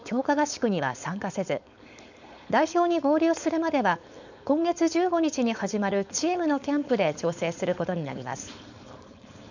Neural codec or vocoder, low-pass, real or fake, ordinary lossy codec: codec, 16 kHz, 4.8 kbps, FACodec; 7.2 kHz; fake; none